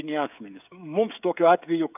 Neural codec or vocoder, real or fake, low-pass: codec, 16 kHz, 16 kbps, FreqCodec, smaller model; fake; 3.6 kHz